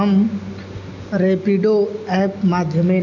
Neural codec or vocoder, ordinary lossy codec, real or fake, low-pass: none; none; real; 7.2 kHz